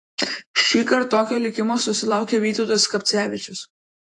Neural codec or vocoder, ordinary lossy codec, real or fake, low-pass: vocoder, 48 kHz, 128 mel bands, Vocos; AAC, 48 kbps; fake; 10.8 kHz